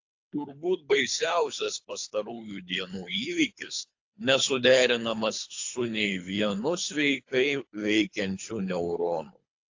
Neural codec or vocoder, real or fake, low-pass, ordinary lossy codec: codec, 24 kHz, 3 kbps, HILCodec; fake; 7.2 kHz; AAC, 48 kbps